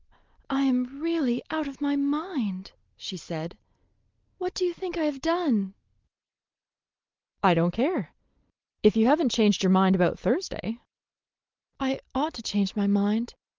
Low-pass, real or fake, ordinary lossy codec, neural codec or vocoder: 7.2 kHz; real; Opus, 32 kbps; none